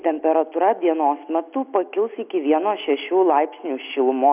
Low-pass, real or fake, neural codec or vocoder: 3.6 kHz; real; none